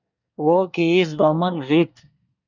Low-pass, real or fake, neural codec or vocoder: 7.2 kHz; fake; codec, 24 kHz, 1 kbps, SNAC